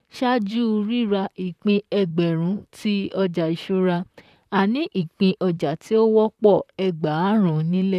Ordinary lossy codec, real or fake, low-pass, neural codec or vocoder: none; fake; 14.4 kHz; vocoder, 44.1 kHz, 128 mel bands, Pupu-Vocoder